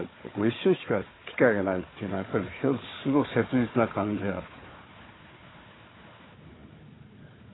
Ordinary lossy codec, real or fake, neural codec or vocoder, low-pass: AAC, 16 kbps; fake; codec, 16 kHz, 4 kbps, FunCodec, trained on Chinese and English, 50 frames a second; 7.2 kHz